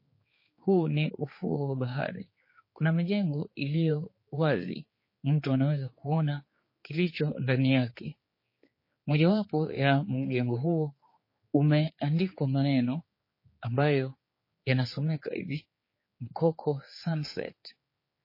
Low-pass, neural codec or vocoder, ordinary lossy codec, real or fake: 5.4 kHz; codec, 16 kHz, 4 kbps, X-Codec, HuBERT features, trained on general audio; MP3, 24 kbps; fake